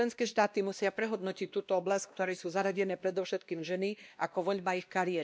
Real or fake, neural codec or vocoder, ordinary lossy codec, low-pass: fake; codec, 16 kHz, 1 kbps, X-Codec, WavLM features, trained on Multilingual LibriSpeech; none; none